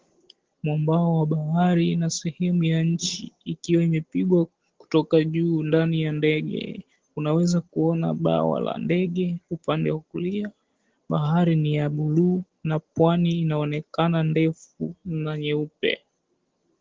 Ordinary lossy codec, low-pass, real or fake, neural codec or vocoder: Opus, 16 kbps; 7.2 kHz; real; none